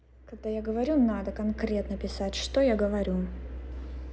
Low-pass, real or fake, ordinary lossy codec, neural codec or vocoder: none; real; none; none